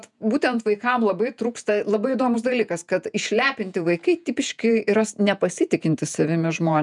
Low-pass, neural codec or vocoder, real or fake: 10.8 kHz; vocoder, 48 kHz, 128 mel bands, Vocos; fake